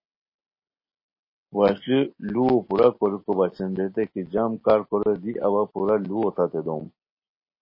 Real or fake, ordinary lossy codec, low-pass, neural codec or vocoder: real; MP3, 24 kbps; 5.4 kHz; none